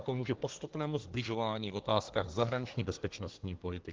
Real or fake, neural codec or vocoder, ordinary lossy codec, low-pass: fake; codec, 24 kHz, 1 kbps, SNAC; Opus, 16 kbps; 7.2 kHz